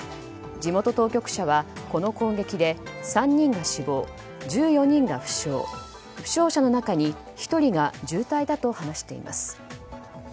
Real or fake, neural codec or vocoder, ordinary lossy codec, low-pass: real; none; none; none